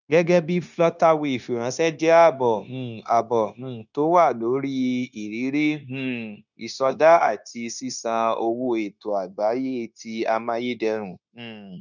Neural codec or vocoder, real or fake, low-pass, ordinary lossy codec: codec, 16 kHz, 0.9 kbps, LongCat-Audio-Codec; fake; 7.2 kHz; none